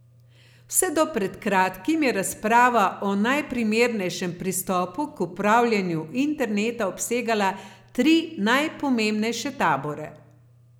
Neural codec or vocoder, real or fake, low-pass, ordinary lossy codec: none; real; none; none